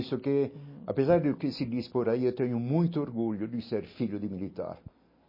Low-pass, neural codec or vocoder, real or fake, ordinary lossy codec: 5.4 kHz; none; real; MP3, 24 kbps